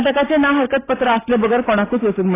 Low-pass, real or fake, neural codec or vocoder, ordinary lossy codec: 3.6 kHz; real; none; AAC, 16 kbps